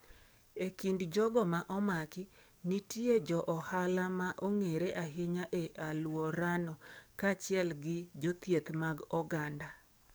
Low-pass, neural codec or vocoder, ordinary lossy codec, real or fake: none; codec, 44.1 kHz, 7.8 kbps, DAC; none; fake